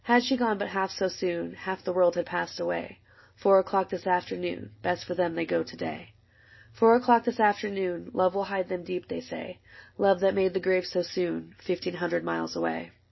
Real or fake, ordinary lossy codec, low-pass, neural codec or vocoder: real; MP3, 24 kbps; 7.2 kHz; none